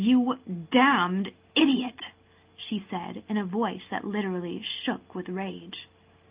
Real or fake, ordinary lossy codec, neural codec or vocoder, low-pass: real; Opus, 24 kbps; none; 3.6 kHz